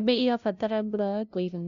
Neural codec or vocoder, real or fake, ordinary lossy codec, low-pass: codec, 16 kHz, 0.5 kbps, FunCodec, trained on LibriTTS, 25 frames a second; fake; none; 7.2 kHz